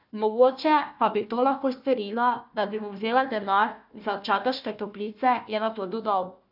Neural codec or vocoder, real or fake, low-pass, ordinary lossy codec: codec, 16 kHz, 1 kbps, FunCodec, trained on Chinese and English, 50 frames a second; fake; 5.4 kHz; none